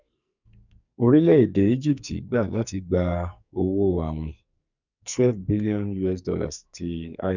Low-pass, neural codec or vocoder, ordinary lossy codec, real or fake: 7.2 kHz; codec, 44.1 kHz, 2.6 kbps, SNAC; none; fake